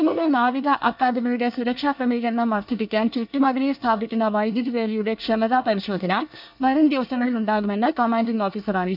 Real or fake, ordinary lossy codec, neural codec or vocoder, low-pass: fake; none; codec, 24 kHz, 1 kbps, SNAC; 5.4 kHz